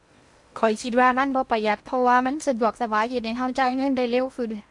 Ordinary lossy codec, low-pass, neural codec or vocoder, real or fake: MP3, 64 kbps; 10.8 kHz; codec, 16 kHz in and 24 kHz out, 0.8 kbps, FocalCodec, streaming, 65536 codes; fake